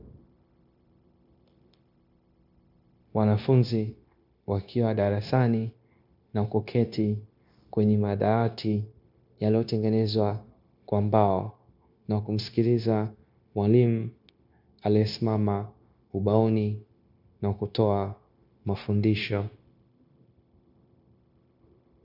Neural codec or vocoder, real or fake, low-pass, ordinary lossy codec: codec, 16 kHz, 0.9 kbps, LongCat-Audio-Codec; fake; 5.4 kHz; MP3, 48 kbps